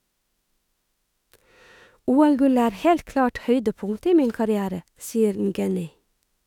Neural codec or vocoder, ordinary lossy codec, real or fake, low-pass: autoencoder, 48 kHz, 32 numbers a frame, DAC-VAE, trained on Japanese speech; none; fake; 19.8 kHz